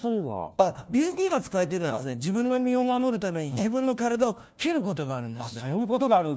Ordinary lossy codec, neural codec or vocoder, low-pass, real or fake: none; codec, 16 kHz, 1 kbps, FunCodec, trained on LibriTTS, 50 frames a second; none; fake